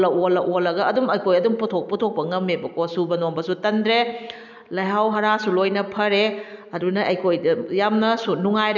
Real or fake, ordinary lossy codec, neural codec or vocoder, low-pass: real; none; none; 7.2 kHz